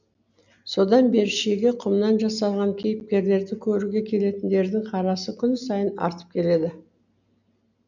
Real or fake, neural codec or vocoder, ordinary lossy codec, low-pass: real; none; none; 7.2 kHz